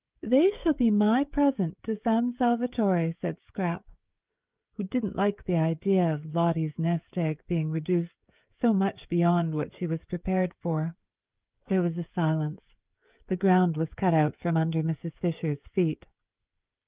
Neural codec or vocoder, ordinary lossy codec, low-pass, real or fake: codec, 16 kHz, 16 kbps, FreqCodec, smaller model; Opus, 24 kbps; 3.6 kHz; fake